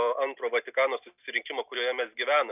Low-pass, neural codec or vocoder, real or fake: 3.6 kHz; none; real